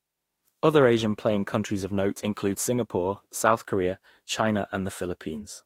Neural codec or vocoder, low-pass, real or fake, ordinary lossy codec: autoencoder, 48 kHz, 32 numbers a frame, DAC-VAE, trained on Japanese speech; 19.8 kHz; fake; AAC, 48 kbps